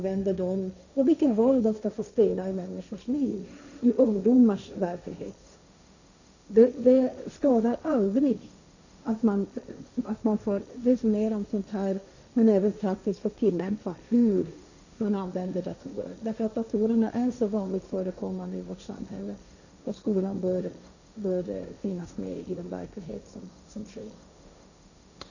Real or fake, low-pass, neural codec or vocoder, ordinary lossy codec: fake; 7.2 kHz; codec, 16 kHz, 1.1 kbps, Voila-Tokenizer; none